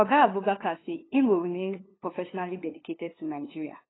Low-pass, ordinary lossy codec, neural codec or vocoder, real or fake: 7.2 kHz; AAC, 16 kbps; codec, 16 kHz, 2 kbps, FunCodec, trained on LibriTTS, 25 frames a second; fake